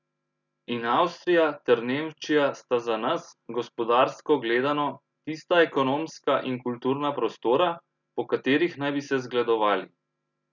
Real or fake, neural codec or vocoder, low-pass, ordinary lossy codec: real; none; 7.2 kHz; none